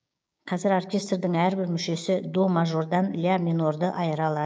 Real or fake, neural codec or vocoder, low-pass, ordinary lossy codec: fake; codec, 16 kHz, 6 kbps, DAC; none; none